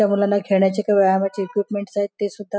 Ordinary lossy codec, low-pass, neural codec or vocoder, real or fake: none; none; none; real